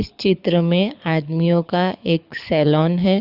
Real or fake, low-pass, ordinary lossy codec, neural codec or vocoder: fake; 5.4 kHz; Opus, 64 kbps; codec, 24 kHz, 6 kbps, HILCodec